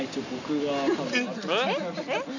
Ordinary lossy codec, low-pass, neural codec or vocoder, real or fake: none; 7.2 kHz; none; real